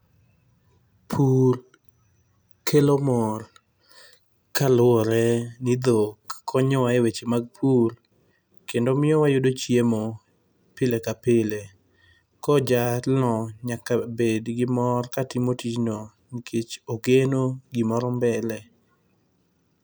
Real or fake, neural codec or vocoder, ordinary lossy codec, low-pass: real; none; none; none